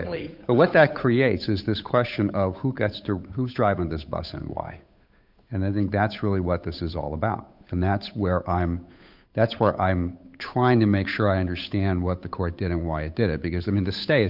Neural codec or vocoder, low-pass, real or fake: codec, 16 kHz, 8 kbps, FunCodec, trained on Chinese and English, 25 frames a second; 5.4 kHz; fake